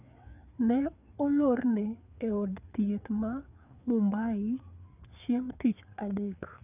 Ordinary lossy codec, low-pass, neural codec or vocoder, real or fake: none; 3.6 kHz; codec, 16 kHz, 4 kbps, FreqCodec, larger model; fake